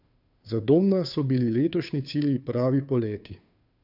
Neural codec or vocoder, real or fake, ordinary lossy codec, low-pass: codec, 16 kHz, 2 kbps, FunCodec, trained on Chinese and English, 25 frames a second; fake; none; 5.4 kHz